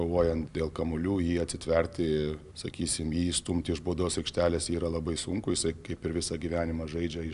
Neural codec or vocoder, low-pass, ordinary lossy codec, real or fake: none; 10.8 kHz; AAC, 96 kbps; real